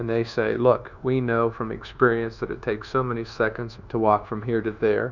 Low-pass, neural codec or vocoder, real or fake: 7.2 kHz; codec, 24 kHz, 1.2 kbps, DualCodec; fake